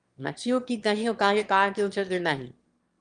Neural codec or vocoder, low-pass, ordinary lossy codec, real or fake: autoencoder, 22.05 kHz, a latent of 192 numbers a frame, VITS, trained on one speaker; 9.9 kHz; Opus, 32 kbps; fake